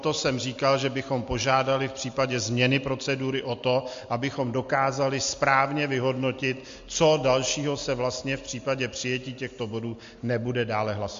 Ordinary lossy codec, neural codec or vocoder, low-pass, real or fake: MP3, 48 kbps; none; 7.2 kHz; real